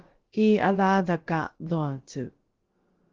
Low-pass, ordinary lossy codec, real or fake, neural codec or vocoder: 7.2 kHz; Opus, 16 kbps; fake; codec, 16 kHz, about 1 kbps, DyCAST, with the encoder's durations